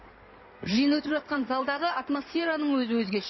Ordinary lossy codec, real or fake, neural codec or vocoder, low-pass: MP3, 24 kbps; fake; codec, 16 kHz in and 24 kHz out, 2.2 kbps, FireRedTTS-2 codec; 7.2 kHz